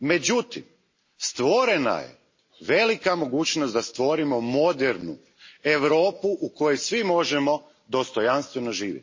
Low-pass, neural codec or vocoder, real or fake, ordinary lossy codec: 7.2 kHz; none; real; MP3, 32 kbps